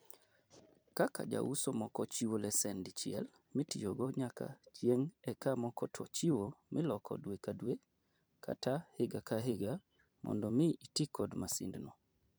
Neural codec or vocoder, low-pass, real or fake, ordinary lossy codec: vocoder, 44.1 kHz, 128 mel bands every 256 samples, BigVGAN v2; none; fake; none